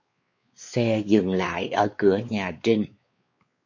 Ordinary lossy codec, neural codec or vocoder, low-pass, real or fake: MP3, 48 kbps; codec, 16 kHz, 4 kbps, X-Codec, WavLM features, trained on Multilingual LibriSpeech; 7.2 kHz; fake